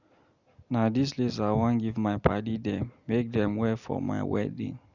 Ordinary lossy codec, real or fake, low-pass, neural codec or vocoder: none; real; 7.2 kHz; none